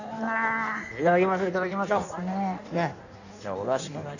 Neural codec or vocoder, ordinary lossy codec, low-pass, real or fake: codec, 16 kHz in and 24 kHz out, 1.1 kbps, FireRedTTS-2 codec; none; 7.2 kHz; fake